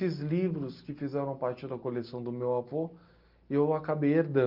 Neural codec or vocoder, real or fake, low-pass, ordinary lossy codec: none; real; 5.4 kHz; Opus, 32 kbps